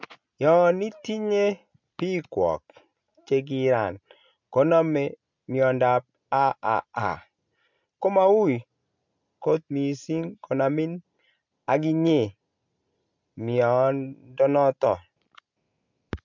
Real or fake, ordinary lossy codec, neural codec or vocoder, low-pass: real; MP3, 64 kbps; none; 7.2 kHz